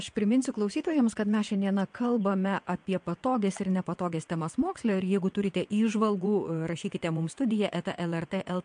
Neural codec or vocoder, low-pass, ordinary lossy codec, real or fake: vocoder, 22.05 kHz, 80 mel bands, WaveNeXt; 9.9 kHz; MP3, 64 kbps; fake